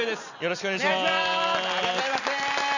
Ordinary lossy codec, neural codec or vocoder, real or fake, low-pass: none; none; real; 7.2 kHz